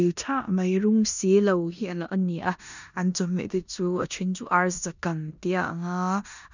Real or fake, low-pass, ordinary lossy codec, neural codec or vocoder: fake; 7.2 kHz; none; codec, 16 kHz in and 24 kHz out, 0.9 kbps, LongCat-Audio-Codec, four codebook decoder